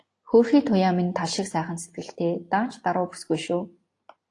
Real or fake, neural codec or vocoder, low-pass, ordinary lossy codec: real; none; 10.8 kHz; AAC, 48 kbps